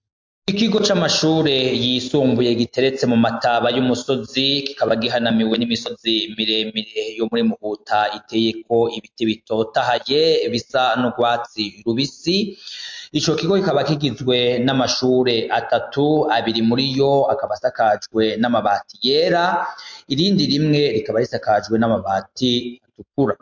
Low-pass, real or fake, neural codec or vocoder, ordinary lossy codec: 7.2 kHz; real; none; MP3, 48 kbps